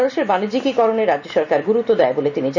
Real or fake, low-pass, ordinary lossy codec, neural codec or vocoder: real; 7.2 kHz; none; none